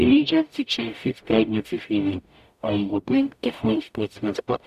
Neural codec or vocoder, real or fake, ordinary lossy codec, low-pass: codec, 44.1 kHz, 0.9 kbps, DAC; fake; none; 14.4 kHz